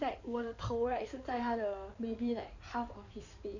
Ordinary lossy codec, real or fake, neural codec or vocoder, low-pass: none; fake; codec, 16 kHz in and 24 kHz out, 2.2 kbps, FireRedTTS-2 codec; 7.2 kHz